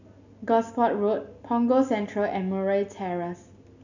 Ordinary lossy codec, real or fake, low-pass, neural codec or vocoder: none; real; 7.2 kHz; none